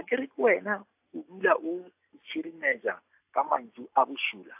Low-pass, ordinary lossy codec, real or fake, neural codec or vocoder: 3.6 kHz; AAC, 32 kbps; real; none